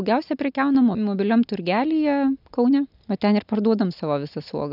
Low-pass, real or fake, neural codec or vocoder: 5.4 kHz; real; none